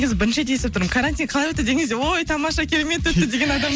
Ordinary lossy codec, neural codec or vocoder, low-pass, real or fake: none; none; none; real